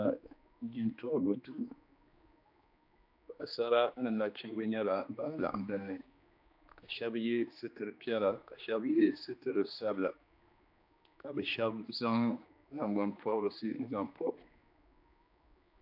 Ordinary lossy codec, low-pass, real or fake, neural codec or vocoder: AAC, 48 kbps; 5.4 kHz; fake; codec, 16 kHz, 2 kbps, X-Codec, HuBERT features, trained on general audio